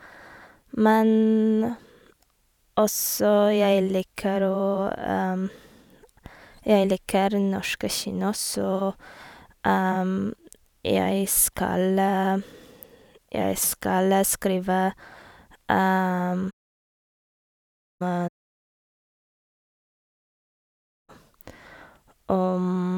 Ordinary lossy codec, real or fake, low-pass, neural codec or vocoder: none; fake; 19.8 kHz; vocoder, 44.1 kHz, 128 mel bands every 512 samples, BigVGAN v2